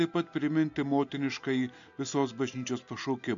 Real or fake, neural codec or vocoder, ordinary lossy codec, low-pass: real; none; MP3, 64 kbps; 7.2 kHz